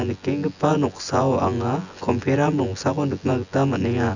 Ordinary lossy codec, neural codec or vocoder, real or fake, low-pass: none; vocoder, 24 kHz, 100 mel bands, Vocos; fake; 7.2 kHz